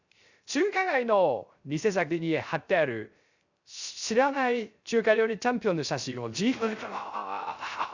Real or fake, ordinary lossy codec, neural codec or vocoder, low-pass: fake; Opus, 64 kbps; codec, 16 kHz, 0.3 kbps, FocalCodec; 7.2 kHz